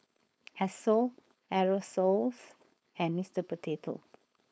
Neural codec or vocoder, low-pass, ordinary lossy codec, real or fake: codec, 16 kHz, 4.8 kbps, FACodec; none; none; fake